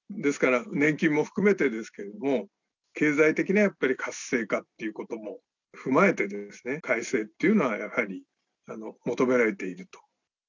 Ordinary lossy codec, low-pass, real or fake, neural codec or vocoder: none; 7.2 kHz; real; none